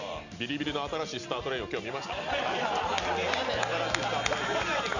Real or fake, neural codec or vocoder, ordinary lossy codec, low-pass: real; none; none; 7.2 kHz